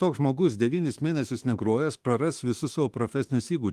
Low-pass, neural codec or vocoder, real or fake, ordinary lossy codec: 14.4 kHz; autoencoder, 48 kHz, 32 numbers a frame, DAC-VAE, trained on Japanese speech; fake; Opus, 24 kbps